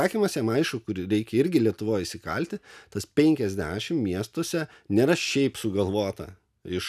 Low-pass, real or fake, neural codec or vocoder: 14.4 kHz; real; none